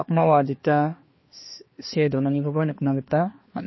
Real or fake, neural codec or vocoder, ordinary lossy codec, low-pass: fake; codec, 16 kHz, 2 kbps, X-Codec, HuBERT features, trained on balanced general audio; MP3, 24 kbps; 7.2 kHz